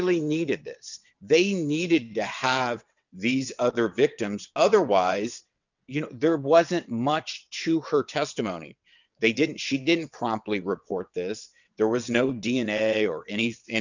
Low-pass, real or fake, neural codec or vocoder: 7.2 kHz; fake; vocoder, 22.05 kHz, 80 mel bands, WaveNeXt